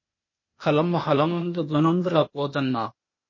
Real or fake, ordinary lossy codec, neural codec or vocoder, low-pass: fake; MP3, 32 kbps; codec, 16 kHz, 0.8 kbps, ZipCodec; 7.2 kHz